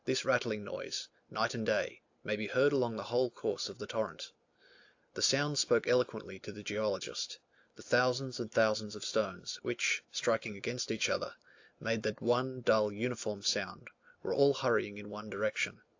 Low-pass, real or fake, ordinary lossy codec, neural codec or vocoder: 7.2 kHz; real; AAC, 48 kbps; none